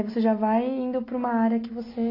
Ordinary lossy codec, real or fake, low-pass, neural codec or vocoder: AAC, 24 kbps; real; 5.4 kHz; none